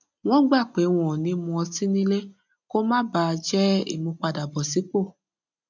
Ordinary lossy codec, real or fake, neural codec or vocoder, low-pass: none; real; none; 7.2 kHz